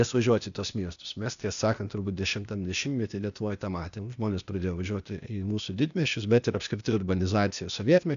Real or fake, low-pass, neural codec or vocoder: fake; 7.2 kHz; codec, 16 kHz, 0.8 kbps, ZipCodec